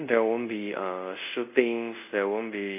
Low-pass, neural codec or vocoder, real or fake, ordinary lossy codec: 3.6 kHz; codec, 24 kHz, 0.5 kbps, DualCodec; fake; none